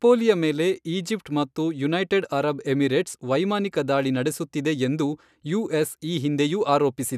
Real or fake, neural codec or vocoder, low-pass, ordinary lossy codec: real; none; 14.4 kHz; none